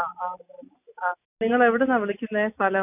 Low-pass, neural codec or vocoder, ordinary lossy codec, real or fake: 3.6 kHz; none; none; real